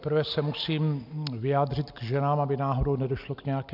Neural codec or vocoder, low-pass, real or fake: none; 5.4 kHz; real